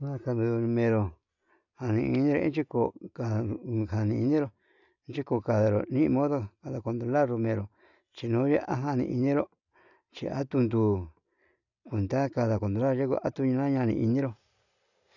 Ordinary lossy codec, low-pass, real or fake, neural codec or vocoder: none; 7.2 kHz; real; none